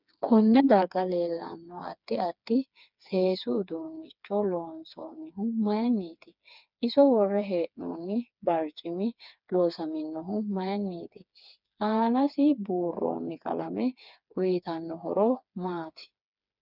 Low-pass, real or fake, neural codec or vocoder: 5.4 kHz; fake; codec, 16 kHz, 4 kbps, FreqCodec, smaller model